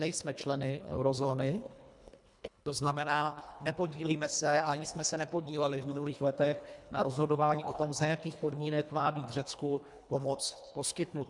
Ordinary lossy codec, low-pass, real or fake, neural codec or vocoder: MP3, 96 kbps; 10.8 kHz; fake; codec, 24 kHz, 1.5 kbps, HILCodec